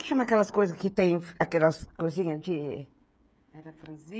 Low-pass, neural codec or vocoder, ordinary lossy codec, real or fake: none; codec, 16 kHz, 8 kbps, FreqCodec, smaller model; none; fake